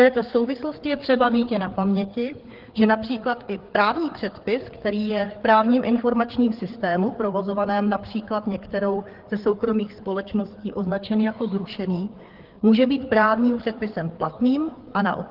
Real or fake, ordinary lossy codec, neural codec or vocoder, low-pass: fake; Opus, 16 kbps; codec, 16 kHz, 4 kbps, FreqCodec, larger model; 5.4 kHz